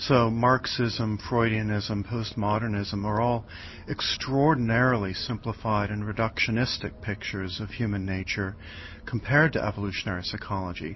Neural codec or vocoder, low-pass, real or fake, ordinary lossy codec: none; 7.2 kHz; real; MP3, 24 kbps